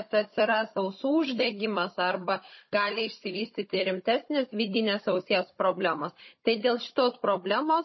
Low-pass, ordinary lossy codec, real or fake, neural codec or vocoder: 7.2 kHz; MP3, 24 kbps; fake; codec, 16 kHz, 16 kbps, FunCodec, trained on Chinese and English, 50 frames a second